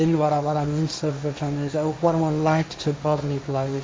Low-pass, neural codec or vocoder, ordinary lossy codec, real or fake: none; codec, 16 kHz, 1.1 kbps, Voila-Tokenizer; none; fake